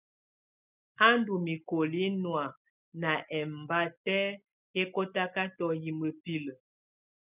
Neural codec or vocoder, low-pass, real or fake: none; 3.6 kHz; real